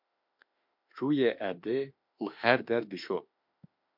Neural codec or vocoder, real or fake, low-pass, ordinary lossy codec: autoencoder, 48 kHz, 32 numbers a frame, DAC-VAE, trained on Japanese speech; fake; 5.4 kHz; MP3, 48 kbps